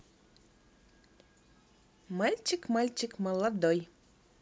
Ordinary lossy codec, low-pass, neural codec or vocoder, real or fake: none; none; none; real